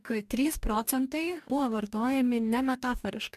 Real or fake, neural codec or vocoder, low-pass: fake; codec, 44.1 kHz, 2.6 kbps, DAC; 14.4 kHz